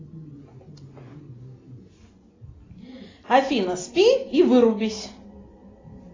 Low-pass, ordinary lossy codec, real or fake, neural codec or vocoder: 7.2 kHz; AAC, 32 kbps; real; none